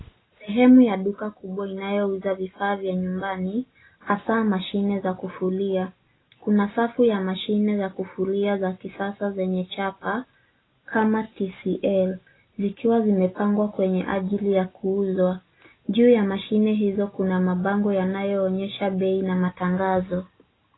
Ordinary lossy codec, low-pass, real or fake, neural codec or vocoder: AAC, 16 kbps; 7.2 kHz; real; none